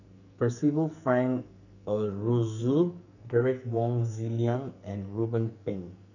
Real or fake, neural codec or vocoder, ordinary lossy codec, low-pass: fake; codec, 44.1 kHz, 2.6 kbps, SNAC; none; 7.2 kHz